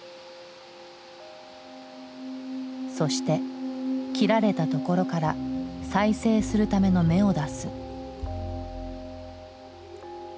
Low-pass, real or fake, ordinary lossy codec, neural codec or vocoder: none; real; none; none